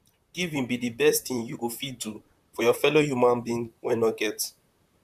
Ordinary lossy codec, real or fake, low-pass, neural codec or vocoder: none; fake; 14.4 kHz; vocoder, 44.1 kHz, 128 mel bands, Pupu-Vocoder